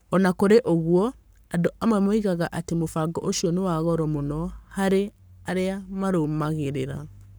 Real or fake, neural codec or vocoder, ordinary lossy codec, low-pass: fake; codec, 44.1 kHz, 7.8 kbps, Pupu-Codec; none; none